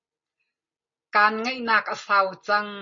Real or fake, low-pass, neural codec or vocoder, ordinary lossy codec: real; 7.2 kHz; none; MP3, 32 kbps